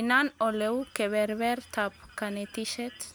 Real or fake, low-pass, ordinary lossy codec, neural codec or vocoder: real; none; none; none